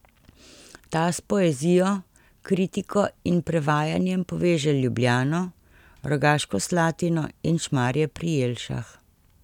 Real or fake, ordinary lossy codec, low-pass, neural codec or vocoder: real; none; 19.8 kHz; none